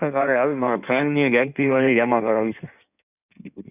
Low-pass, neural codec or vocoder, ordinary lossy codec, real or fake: 3.6 kHz; codec, 16 kHz in and 24 kHz out, 1.1 kbps, FireRedTTS-2 codec; none; fake